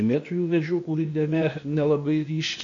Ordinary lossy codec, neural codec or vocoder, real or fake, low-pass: AAC, 48 kbps; codec, 16 kHz, 0.8 kbps, ZipCodec; fake; 7.2 kHz